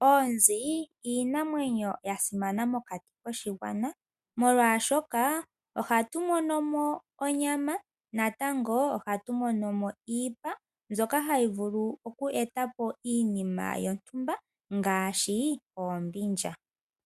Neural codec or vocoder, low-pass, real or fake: none; 14.4 kHz; real